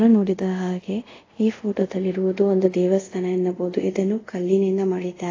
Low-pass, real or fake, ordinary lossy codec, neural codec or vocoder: 7.2 kHz; fake; AAC, 32 kbps; codec, 24 kHz, 0.5 kbps, DualCodec